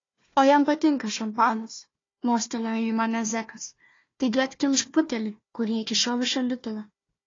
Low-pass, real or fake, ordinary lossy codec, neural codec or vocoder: 7.2 kHz; fake; AAC, 32 kbps; codec, 16 kHz, 1 kbps, FunCodec, trained on Chinese and English, 50 frames a second